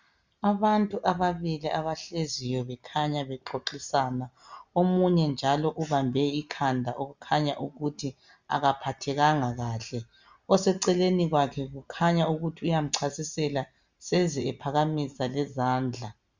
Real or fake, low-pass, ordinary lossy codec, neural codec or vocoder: real; 7.2 kHz; Opus, 64 kbps; none